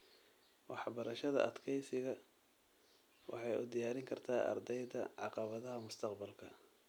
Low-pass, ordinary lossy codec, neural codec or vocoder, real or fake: none; none; none; real